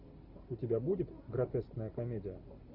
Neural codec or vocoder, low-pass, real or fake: none; 5.4 kHz; real